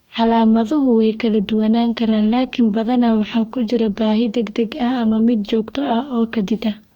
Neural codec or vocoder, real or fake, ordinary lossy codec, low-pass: codec, 44.1 kHz, 2.6 kbps, DAC; fake; Opus, 64 kbps; 19.8 kHz